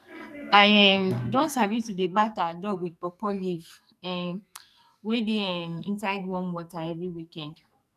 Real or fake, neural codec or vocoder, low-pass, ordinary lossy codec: fake; codec, 44.1 kHz, 2.6 kbps, SNAC; 14.4 kHz; none